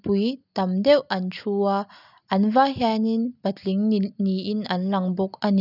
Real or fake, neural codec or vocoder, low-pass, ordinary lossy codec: real; none; 5.4 kHz; none